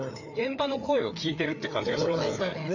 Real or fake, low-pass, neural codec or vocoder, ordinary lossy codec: fake; 7.2 kHz; codec, 16 kHz, 4 kbps, FreqCodec, larger model; none